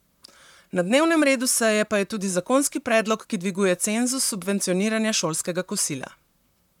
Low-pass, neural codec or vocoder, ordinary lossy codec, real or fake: 19.8 kHz; vocoder, 44.1 kHz, 128 mel bands, Pupu-Vocoder; none; fake